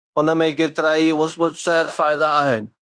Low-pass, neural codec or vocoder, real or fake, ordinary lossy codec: 9.9 kHz; codec, 16 kHz in and 24 kHz out, 0.9 kbps, LongCat-Audio-Codec, fine tuned four codebook decoder; fake; Opus, 64 kbps